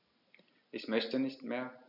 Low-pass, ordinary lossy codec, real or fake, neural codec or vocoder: 5.4 kHz; none; real; none